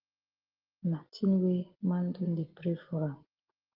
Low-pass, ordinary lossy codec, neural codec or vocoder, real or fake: 5.4 kHz; Opus, 16 kbps; none; real